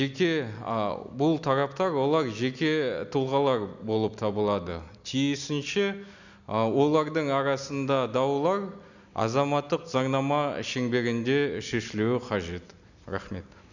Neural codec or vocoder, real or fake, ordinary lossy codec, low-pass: none; real; none; 7.2 kHz